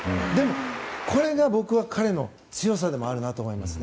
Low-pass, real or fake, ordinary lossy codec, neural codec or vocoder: none; real; none; none